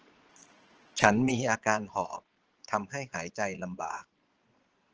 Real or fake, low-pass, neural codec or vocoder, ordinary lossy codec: fake; 7.2 kHz; vocoder, 22.05 kHz, 80 mel bands, Vocos; Opus, 16 kbps